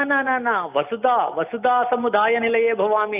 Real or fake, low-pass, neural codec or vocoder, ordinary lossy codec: real; 3.6 kHz; none; none